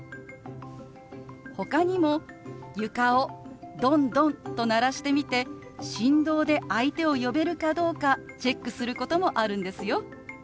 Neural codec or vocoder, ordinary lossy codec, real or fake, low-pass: none; none; real; none